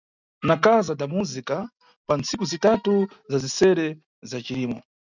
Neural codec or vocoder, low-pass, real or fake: none; 7.2 kHz; real